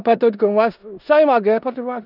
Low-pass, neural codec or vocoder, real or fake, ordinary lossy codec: 5.4 kHz; codec, 16 kHz in and 24 kHz out, 0.9 kbps, LongCat-Audio-Codec, four codebook decoder; fake; none